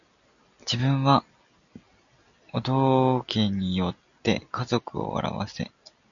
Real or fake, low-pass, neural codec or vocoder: real; 7.2 kHz; none